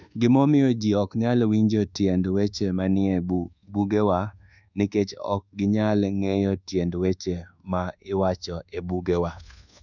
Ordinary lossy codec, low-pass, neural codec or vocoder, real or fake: none; 7.2 kHz; codec, 24 kHz, 1.2 kbps, DualCodec; fake